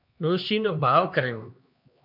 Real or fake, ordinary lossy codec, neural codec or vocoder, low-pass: fake; MP3, 48 kbps; codec, 16 kHz, 2 kbps, X-Codec, HuBERT features, trained on LibriSpeech; 5.4 kHz